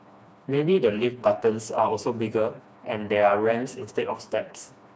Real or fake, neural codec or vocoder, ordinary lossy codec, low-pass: fake; codec, 16 kHz, 2 kbps, FreqCodec, smaller model; none; none